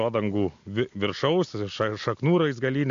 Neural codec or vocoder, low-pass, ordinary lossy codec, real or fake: none; 7.2 kHz; AAC, 64 kbps; real